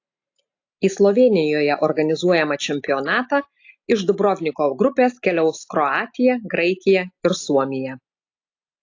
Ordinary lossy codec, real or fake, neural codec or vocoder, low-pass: AAC, 48 kbps; real; none; 7.2 kHz